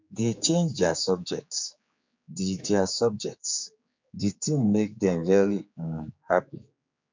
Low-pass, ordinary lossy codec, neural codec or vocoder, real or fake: 7.2 kHz; MP3, 64 kbps; codec, 16 kHz, 4 kbps, X-Codec, HuBERT features, trained on general audio; fake